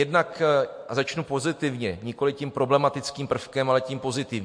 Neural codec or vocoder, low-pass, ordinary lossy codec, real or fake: none; 9.9 kHz; MP3, 48 kbps; real